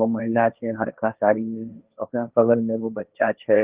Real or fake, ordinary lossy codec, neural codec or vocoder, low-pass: fake; Opus, 24 kbps; codec, 16 kHz, 1.1 kbps, Voila-Tokenizer; 3.6 kHz